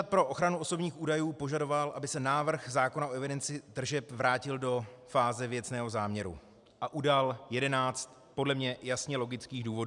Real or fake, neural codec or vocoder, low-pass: real; none; 10.8 kHz